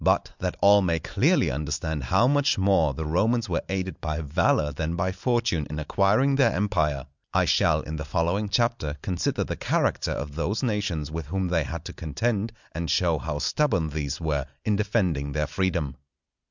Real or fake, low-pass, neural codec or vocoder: real; 7.2 kHz; none